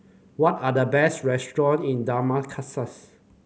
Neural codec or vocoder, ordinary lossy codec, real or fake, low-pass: none; none; real; none